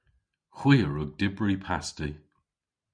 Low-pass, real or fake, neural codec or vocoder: 9.9 kHz; real; none